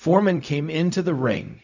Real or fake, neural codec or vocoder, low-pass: fake; codec, 16 kHz, 0.4 kbps, LongCat-Audio-Codec; 7.2 kHz